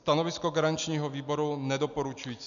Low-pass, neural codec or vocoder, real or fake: 7.2 kHz; none; real